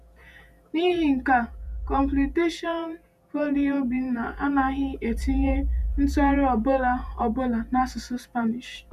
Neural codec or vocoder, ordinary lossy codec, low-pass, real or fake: vocoder, 48 kHz, 128 mel bands, Vocos; none; 14.4 kHz; fake